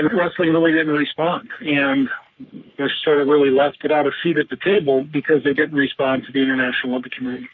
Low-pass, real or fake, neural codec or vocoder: 7.2 kHz; fake; codec, 44.1 kHz, 3.4 kbps, Pupu-Codec